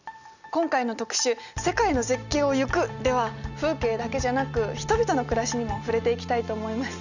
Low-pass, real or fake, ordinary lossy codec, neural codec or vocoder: 7.2 kHz; real; none; none